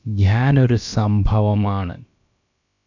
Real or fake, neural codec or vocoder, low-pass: fake; codec, 16 kHz, about 1 kbps, DyCAST, with the encoder's durations; 7.2 kHz